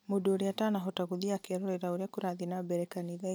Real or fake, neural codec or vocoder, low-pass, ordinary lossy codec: real; none; none; none